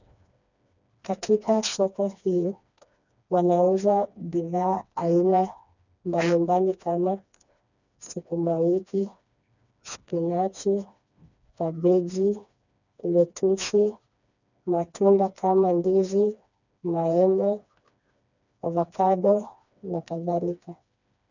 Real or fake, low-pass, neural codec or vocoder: fake; 7.2 kHz; codec, 16 kHz, 2 kbps, FreqCodec, smaller model